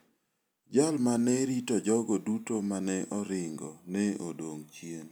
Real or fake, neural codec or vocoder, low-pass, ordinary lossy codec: real; none; none; none